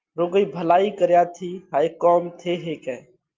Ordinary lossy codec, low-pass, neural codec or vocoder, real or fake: Opus, 32 kbps; 7.2 kHz; none; real